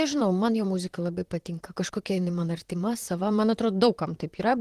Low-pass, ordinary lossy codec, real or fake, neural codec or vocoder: 14.4 kHz; Opus, 16 kbps; fake; vocoder, 44.1 kHz, 128 mel bands, Pupu-Vocoder